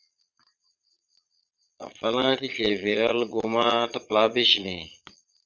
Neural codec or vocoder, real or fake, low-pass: vocoder, 22.05 kHz, 80 mel bands, Vocos; fake; 7.2 kHz